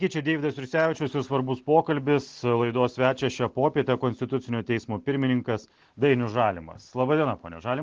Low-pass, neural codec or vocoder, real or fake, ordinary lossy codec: 7.2 kHz; none; real; Opus, 16 kbps